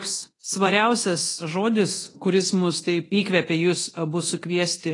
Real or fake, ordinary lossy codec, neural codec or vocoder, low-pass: fake; AAC, 32 kbps; codec, 24 kHz, 0.9 kbps, DualCodec; 10.8 kHz